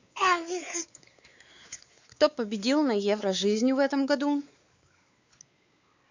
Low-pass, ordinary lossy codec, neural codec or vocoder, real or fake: 7.2 kHz; Opus, 64 kbps; codec, 16 kHz, 4 kbps, X-Codec, WavLM features, trained on Multilingual LibriSpeech; fake